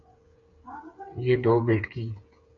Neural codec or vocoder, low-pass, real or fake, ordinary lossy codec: codec, 16 kHz, 8 kbps, FreqCodec, smaller model; 7.2 kHz; fake; AAC, 64 kbps